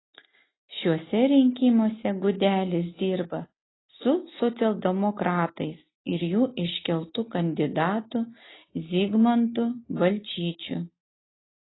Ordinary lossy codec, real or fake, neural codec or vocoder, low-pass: AAC, 16 kbps; real; none; 7.2 kHz